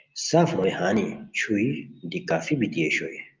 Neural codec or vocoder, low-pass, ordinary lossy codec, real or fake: none; 7.2 kHz; Opus, 32 kbps; real